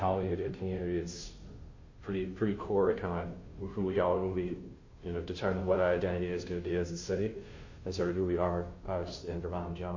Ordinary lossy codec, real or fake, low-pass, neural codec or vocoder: MP3, 32 kbps; fake; 7.2 kHz; codec, 16 kHz, 0.5 kbps, FunCodec, trained on Chinese and English, 25 frames a second